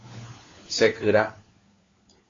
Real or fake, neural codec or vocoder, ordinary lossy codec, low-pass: fake; codec, 16 kHz, 4 kbps, FunCodec, trained on LibriTTS, 50 frames a second; AAC, 32 kbps; 7.2 kHz